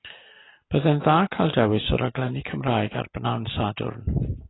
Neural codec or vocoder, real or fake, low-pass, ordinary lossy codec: none; real; 7.2 kHz; AAC, 16 kbps